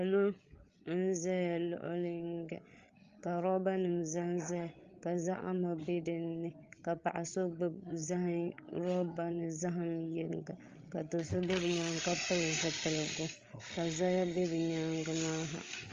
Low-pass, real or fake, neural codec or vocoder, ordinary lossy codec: 7.2 kHz; fake; codec, 16 kHz, 8 kbps, FreqCodec, larger model; Opus, 32 kbps